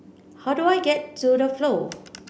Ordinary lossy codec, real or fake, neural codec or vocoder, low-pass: none; real; none; none